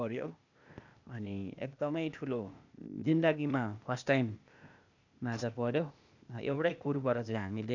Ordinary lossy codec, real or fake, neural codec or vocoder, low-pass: none; fake; codec, 16 kHz, 0.8 kbps, ZipCodec; 7.2 kHz